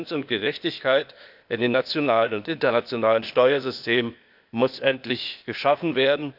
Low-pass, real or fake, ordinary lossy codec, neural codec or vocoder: 5.4 kHz; fake; none; codec, 16 kHz, 0.8 kbps, ZipCodec